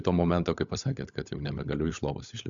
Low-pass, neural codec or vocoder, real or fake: 7.2 kHz; codec, 16 kHz, 16 kbps, FunCodec, trained on LibriTTS, 50 frames a second; fake